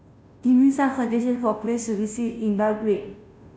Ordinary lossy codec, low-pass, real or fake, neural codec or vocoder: none; none; fake; codec, 16 kHz, 0.5 kbps, FunCodec, trained on Chinese and English, 25 frames a second